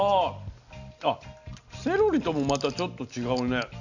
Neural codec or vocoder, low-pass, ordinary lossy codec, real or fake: none; 7.2 kHz; none; real